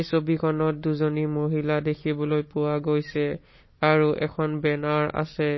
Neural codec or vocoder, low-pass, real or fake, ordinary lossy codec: none; 7.2 kHz; real; MP3, 24 kbps